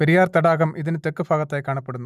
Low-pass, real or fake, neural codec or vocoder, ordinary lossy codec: 14.4 kHz; real; none; none